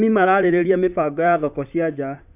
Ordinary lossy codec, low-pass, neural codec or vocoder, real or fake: none; 3.6 kHz; vocoder, 44.1 kHz, 128 mel bands, Pupu-Vocoder; fake